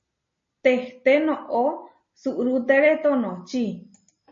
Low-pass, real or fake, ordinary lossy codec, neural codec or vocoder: 7.2 kHz; real; MP3, 32 kbps; none